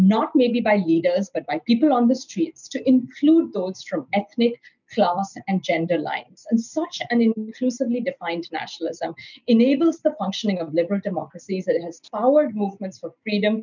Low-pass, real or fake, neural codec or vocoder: 7.2 kHz; real; none